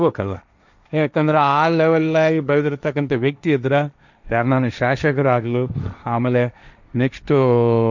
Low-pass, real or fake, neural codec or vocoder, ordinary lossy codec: 7.2 kHz; fake; codec, 16 kHz, 1.1 kbps, Voila-Tokenizer; none